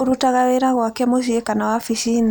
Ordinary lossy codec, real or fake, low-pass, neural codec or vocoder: none; real; none; none